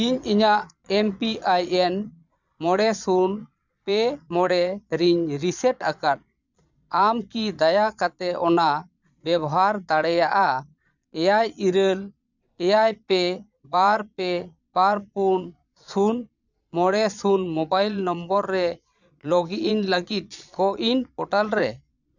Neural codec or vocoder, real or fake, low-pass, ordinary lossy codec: vocoder, 22.05 kHz, 80 mel bands, Vocos; fake; 7.2 kHz; none